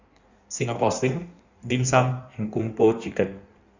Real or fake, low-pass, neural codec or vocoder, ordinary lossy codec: fake; 7.2 kHz; codec, 16 kHz in and 24 kHz out, 1.1 kbps, FireRedTTS-2 codec; Opus, 64 kbps